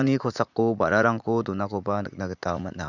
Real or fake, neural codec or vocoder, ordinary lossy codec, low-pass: fake; vocoder, 44.1 kHz, 80 mel bands, Vocos; none; 7.2 kHz